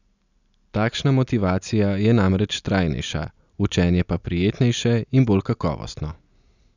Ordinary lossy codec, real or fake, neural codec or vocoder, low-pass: none; real; none; 7.2 kHz